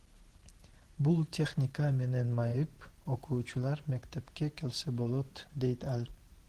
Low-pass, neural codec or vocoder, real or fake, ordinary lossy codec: 14.4 kHz; vocoder, 44.1 kHz, 128 mel bands every 512 samples, BigVGAN v2; fake; Opus, 16 kbps